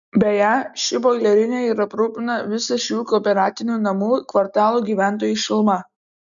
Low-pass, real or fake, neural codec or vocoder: 7.2 kHz; real; none